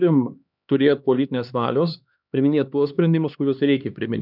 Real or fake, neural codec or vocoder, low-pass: fake; codec, 16 kHz, 2 kbps, X-Codec, HuBERT features, trained on LibriSpeech; 5.4 kHz